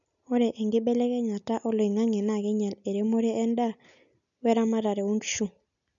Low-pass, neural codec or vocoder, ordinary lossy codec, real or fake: 7.2 kHz; none; none; real